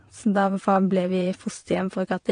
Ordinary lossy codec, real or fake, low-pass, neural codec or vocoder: AAC, 48 kbps; fake; 9.9 kHz; vocoder, 22.05 kHz, 80 mel bands, WaveNeXt